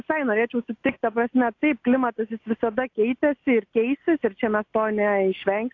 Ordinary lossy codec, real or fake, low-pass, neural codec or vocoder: AAC, 48 kbps; real; 7.2 kHz; none